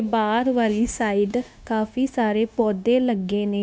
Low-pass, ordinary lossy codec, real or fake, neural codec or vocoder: none; none; fake; codec, 16 kHz, 0.9 kbps, LongCat-Audio-Codec